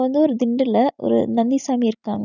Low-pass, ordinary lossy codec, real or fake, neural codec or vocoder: 7.2 kHz; none; real; none